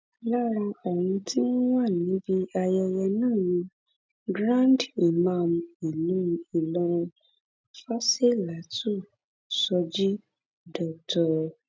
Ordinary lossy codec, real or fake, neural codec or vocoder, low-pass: none; real; none; none